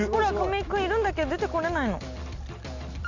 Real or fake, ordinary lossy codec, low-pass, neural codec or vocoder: real; Opus, 64 kbps; 7.2 kHz; none